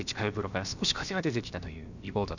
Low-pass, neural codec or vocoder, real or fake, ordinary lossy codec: 7.2 kHz; codec, 16 kHz, about 1 kbps, DyCAST, with the encoder's durations; fake; none